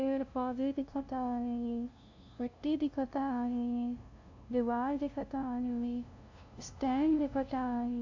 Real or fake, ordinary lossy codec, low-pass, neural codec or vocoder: fake; AAC, 48 kbps; 7.2 kHz; codec, 16 kHz, 0.5 kbps, FunCodec, trained on LibriTTS, 25 frames a second